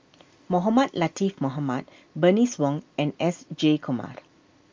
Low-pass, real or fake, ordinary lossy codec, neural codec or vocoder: 7.2 kHz; real; Opus, 32 kbps; none